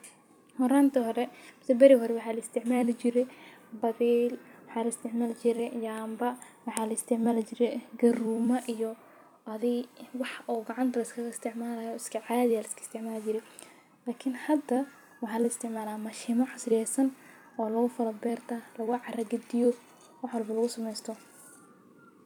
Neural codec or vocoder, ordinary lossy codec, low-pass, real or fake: vocoder, 44.1 kHz, 128 mel bands every 256 samples, BigVGAN v2; none; 19.8 kHz; fake